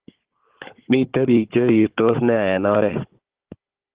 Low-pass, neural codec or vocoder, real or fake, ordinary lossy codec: 3.6 kHz; codec, 16 kHz, 16 kbps, FunCodec, trained on Chinese and English, 50 frames a second; fake; Opus, 16 kbps